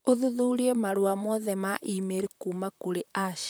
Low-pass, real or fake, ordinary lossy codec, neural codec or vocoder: none; fake; none; vocoder, 44.1 kHz, 128 mel bands, Pupu-Vocoder